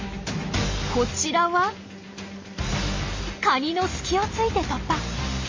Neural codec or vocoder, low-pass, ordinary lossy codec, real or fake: none; 7.2 kHz; MP3, 32 kbps; real